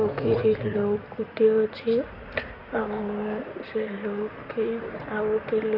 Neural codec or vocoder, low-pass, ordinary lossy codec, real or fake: codec, 16 kHz in and 24 kHz out, 2.2 kbps, FireRedTTS-2 codec; 5.4 kHz; none; fake